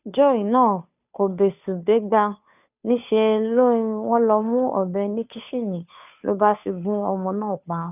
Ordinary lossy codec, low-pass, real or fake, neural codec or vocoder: none; 3.6 kHz; fake; codec, 16 kHz, 2 kbps, FunCodec, trained on Chinese and English, 25 frames a second